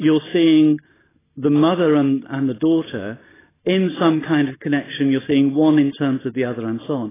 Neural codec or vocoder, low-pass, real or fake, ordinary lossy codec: none; 3.6 kHz; real; AAC, 16 kbps